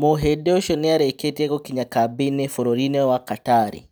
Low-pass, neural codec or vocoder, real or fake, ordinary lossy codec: none; none; real; none